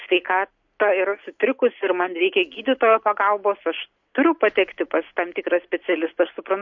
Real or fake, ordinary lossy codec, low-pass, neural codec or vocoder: real; MP3, 48 kbps; 7.2 kHz; none